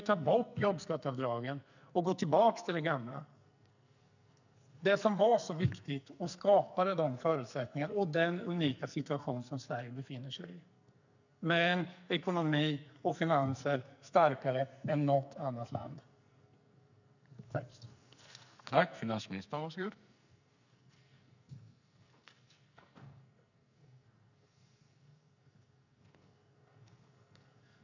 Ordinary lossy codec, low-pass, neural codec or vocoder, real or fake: none; 7.2 kHz; codec, 44.1 kHz, 2.6 kbps, SNAC; fake